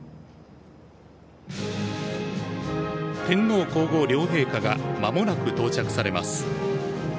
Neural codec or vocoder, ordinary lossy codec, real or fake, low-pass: none; none; real; none